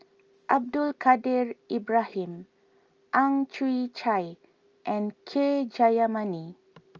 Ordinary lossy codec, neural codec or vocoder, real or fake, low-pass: Opus, 24 kbps; none; real; 7.2 kHz